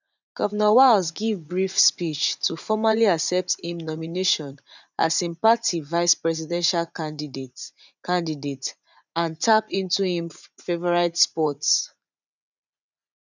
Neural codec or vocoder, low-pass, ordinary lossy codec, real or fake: vocoder, 44.1 kHz, 80 mel bands, Vocos; 7.2 kHz; none; fake